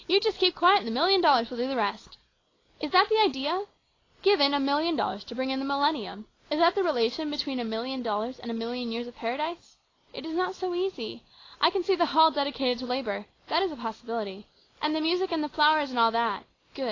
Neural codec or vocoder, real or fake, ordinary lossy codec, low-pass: none; real; AAC, 32 kbps; 7.2 kHz